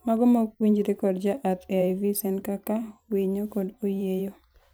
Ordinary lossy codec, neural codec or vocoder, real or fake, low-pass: none; vocoder, 44.1 kHz, 128 mel bands every 512 samples, BigVGAN v2; fake; none